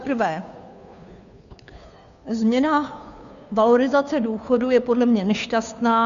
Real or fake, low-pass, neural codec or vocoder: fake; 7.2 kHz; codec, 16 kHz, 2 kbps, FunCodec, trained on Chinese and English, 25 frames a second